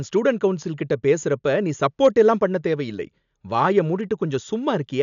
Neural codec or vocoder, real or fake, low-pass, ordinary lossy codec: none; real; 7.2 kHz; MP3, 96 kbps